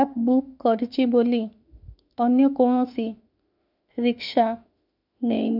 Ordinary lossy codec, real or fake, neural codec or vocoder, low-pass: none; fake; autoencoder, 48 kHz, 32 numbers a frame, DAC-VAE, trained on Japanese speech; 5.4 kHz